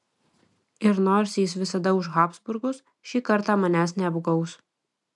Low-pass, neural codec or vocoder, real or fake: 10.8 kHz; none; real